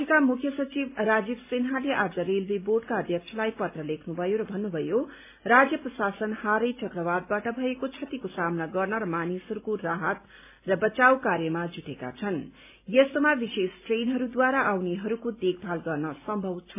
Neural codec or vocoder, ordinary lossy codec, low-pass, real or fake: none; MP3, 32 kbps; 3.6 kHz; real